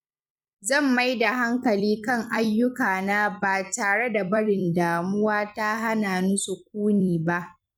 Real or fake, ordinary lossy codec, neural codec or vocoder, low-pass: fake; none; vocoder, 44.1 kHz, 128 mel bands every 256 samples, BigVGAN v2; 19.8 kHz